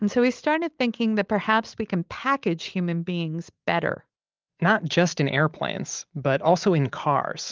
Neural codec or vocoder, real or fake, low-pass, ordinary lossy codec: none; real; 7.2 kHz; Opus, 32 kbps